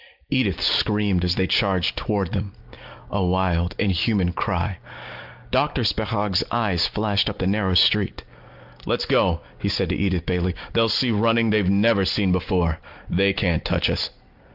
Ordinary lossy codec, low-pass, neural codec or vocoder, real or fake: Opus, 32 kbps; 5.4 kHz; none; real